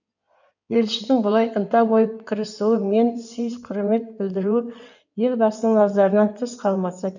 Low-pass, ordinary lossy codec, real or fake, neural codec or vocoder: 7.2 kHz; none; fake; codec, 16 kHz in and 24 kHz out, 2.2 kbps, FireRedTTS-2 codec